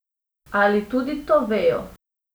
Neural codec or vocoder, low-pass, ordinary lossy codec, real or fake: none; none; none; real